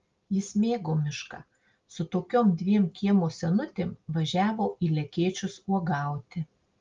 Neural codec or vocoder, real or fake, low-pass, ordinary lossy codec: none; real; 7.2 kHz; Opus, 32 kbps